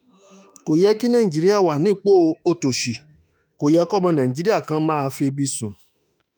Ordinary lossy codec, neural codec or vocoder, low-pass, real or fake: none; autoencoder, 48 kHz, 32 numbers a frame, DAC-VAE, trained on Japanese speech; none; fake